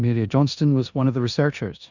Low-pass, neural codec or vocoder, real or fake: 7.2 kHz; codec, 16 kHz in and 24 kHz out, 0.9 kbps, LongCat-Audio-Codec, four codebook decoder; fake